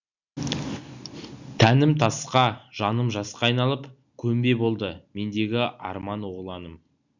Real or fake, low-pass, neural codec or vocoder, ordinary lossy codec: real; 7.2 kHz; none; none